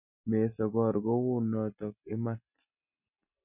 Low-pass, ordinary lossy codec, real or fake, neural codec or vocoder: 3.6 kHz; none; real; none